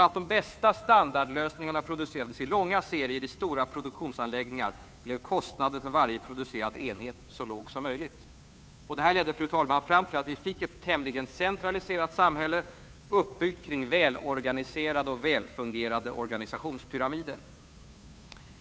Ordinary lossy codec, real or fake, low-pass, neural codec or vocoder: none; fake; none; codec, 16 kHz, 2 kbps, FunCodec, trained on Chinese and English, 25 frames a second